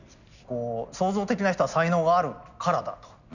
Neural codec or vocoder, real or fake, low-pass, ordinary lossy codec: none; real; 7.2 kHz; none